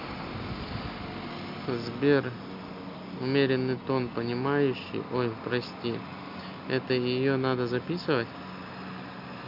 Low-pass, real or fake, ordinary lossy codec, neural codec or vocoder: 5.4 kHz; real; MP3, 48 kbps; none